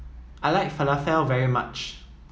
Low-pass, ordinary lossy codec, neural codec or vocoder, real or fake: none; none; none; real